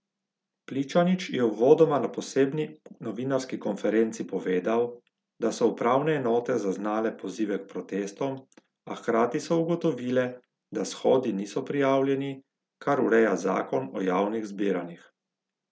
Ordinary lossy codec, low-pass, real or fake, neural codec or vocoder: none; none; real; none